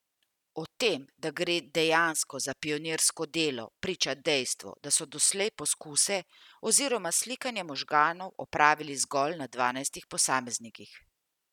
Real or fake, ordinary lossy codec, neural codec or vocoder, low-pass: real; none; none; 19.8 kHz